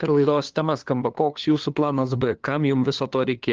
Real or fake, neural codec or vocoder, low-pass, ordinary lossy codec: fake; codec, 16 kHz, 2 kbps, FunCodec, trained on LibriTTS, 25 frames a second; 7.2 kHz; Opus, 16 kbps